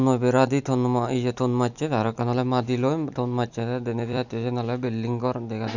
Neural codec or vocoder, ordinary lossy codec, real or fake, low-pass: vocoder, 44.1 kHz, 128 mel bands every 256 samples, BigVGAN v2; none; fake; 7.2 kHz